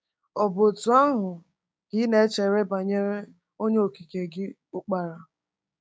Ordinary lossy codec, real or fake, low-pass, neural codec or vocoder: none; fake; none; codec, 16 kHz, 6 kbps, DAC